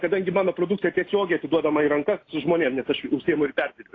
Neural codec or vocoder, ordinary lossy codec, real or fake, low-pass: none; AAC, 32 kbps; real; 7.2 kHz